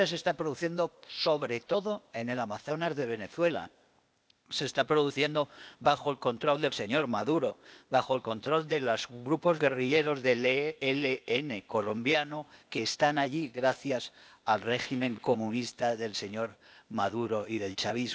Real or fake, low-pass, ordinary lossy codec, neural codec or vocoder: fake; none; none; codec, 16 kHz, 0.8 kbps, ZipCodec